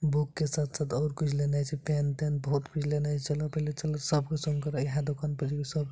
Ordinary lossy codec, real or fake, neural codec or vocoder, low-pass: none; real; none; none